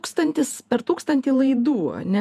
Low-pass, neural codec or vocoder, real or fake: 14.4 kHz; vocoder, 48 kHz, 128 mel bands, Vocos; fake